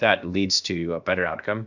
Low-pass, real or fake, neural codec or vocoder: 7.2 kHz; fake; codec, 16 kHz, about 1 kbps, DyCAST, with the encoder's durations